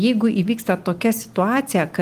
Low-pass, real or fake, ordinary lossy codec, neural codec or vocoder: 14.4 kHz; real; Opus, 24 kbps; none